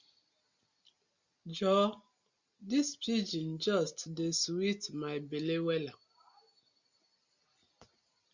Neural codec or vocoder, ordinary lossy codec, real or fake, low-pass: none; Opus, 64 kbps; real; 7.2 kHz